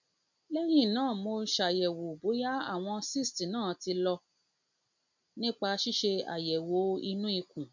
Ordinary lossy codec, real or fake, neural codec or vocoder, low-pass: MP3, 64 kbps; real; none; 7.2 kHz